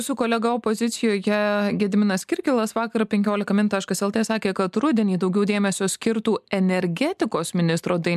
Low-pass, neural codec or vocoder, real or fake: 14.4 kHz; none; real